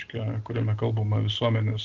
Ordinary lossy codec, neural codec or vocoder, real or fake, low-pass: Opus, 16 kbps; none; real; 7.2 kHz